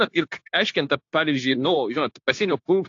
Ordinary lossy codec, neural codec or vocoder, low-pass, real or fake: AAC, 48 kbps; codec, 16 kHz, 0.9 kbps, LongCat-Audio-Codec; 7.2 kHz; fake